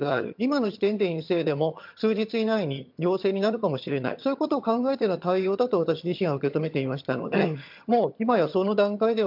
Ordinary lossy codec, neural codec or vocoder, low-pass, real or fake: MP3, 48 kbps; vocoder, 22.05 kHz, 80 mel bands, HiFi-GAN; 5.4 kHz; fake